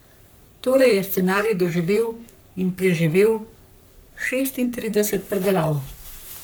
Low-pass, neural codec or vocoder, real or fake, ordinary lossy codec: none; codec, 44.1 kHz, 3.4 kbps, Pupu-Codec; fake; none